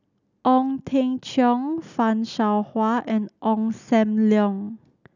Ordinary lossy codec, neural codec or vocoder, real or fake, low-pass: none; none; real; 7.2 kHz